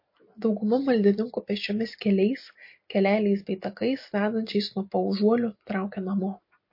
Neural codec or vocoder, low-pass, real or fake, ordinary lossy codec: none; 5.4 kHz; real; MP3, 32 kbps